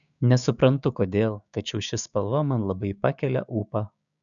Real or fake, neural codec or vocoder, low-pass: fake; codec, 16 kHz, 6 kbps, DAC; 7.2 kHz